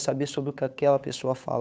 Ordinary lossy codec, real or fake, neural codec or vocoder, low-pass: none; fake; codec, 16 kHz, 8 kbps, FunCodec, trained on Chinese and English, 25 frames a second; none